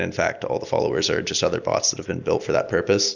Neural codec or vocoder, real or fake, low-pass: none; real; 7.2 kHz